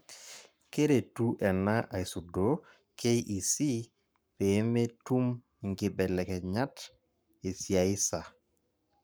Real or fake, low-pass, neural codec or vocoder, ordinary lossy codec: fake; none; codec, 44.1 kHz, 7.8 kbps, Pupu-Codec; none